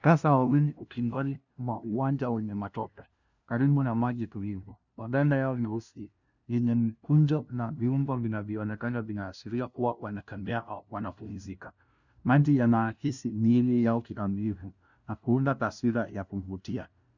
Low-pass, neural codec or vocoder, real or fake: 7.2 kHz; codec, 16 kHz, 0.5 kbps, FunCodec, trained on LibriTTS, 25 frames a second; fake